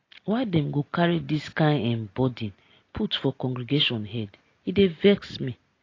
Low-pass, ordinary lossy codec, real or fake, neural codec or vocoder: 7.2 kHz; AAC, 32 kbps; real; none